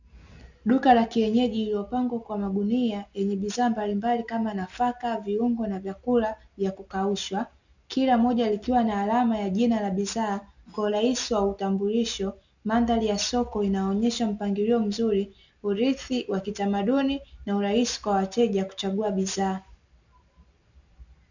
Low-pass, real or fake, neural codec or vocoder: 7.2 kHz; real; none